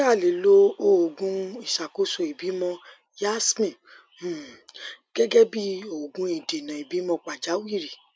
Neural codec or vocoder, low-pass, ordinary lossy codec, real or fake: none; none; none; real